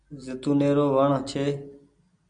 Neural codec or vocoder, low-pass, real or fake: none; 9.9 kHz; real